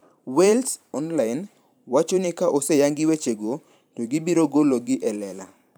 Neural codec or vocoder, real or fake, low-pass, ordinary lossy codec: vocoder, 44.1 kHz, 128 mel bands every 512 samples, BigVGAN v2; fake; none; none